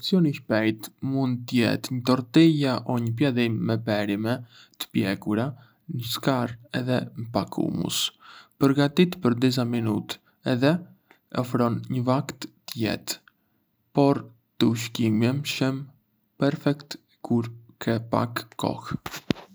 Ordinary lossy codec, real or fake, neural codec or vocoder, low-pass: none; real; none; none